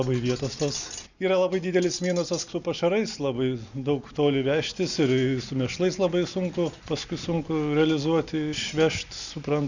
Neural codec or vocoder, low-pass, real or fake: none; 7.2 kHz; real